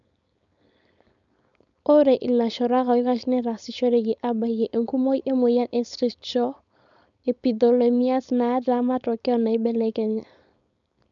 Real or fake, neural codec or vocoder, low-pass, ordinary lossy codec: fake; codec, 16 kHz, 4.8 kbps, FACodec; 7.2 kHz; none